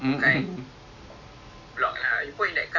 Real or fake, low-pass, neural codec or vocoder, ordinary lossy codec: real; 7.2 kHz; none; Opus, 64 kbps